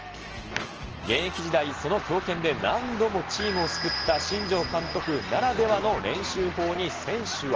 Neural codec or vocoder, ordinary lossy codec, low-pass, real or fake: none; Opus, 16 kbps; 7.2 kHz; real